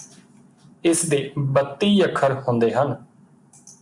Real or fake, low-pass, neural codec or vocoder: real; 10.8 kHz; none